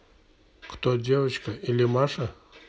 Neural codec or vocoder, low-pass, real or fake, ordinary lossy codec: none; none; real; none